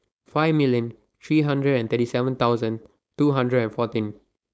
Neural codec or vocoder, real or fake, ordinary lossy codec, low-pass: codec, 16 kHz, 4.8 kbps, FACodec; fake; none; none